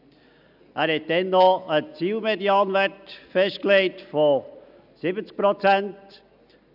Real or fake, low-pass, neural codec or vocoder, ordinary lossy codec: real; 5.4 kHz; none; none